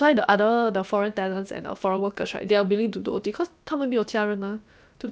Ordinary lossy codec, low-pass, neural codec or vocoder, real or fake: none; none; codec, 16 kHz, about 1 kbps, DyCAST, with the encoder's durations; fake